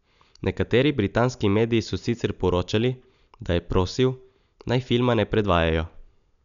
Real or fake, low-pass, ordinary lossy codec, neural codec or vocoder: real; 7.2 kHz; none; none